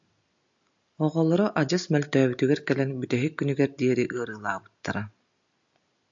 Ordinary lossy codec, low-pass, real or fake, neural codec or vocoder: AAC, 64 kbps; 7.2 kHz; real; none